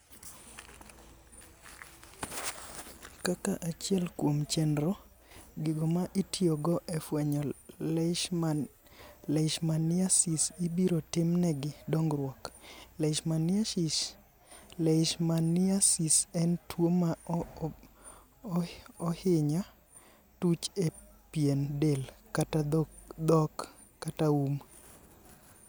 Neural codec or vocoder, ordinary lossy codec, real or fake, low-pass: none; none; real; none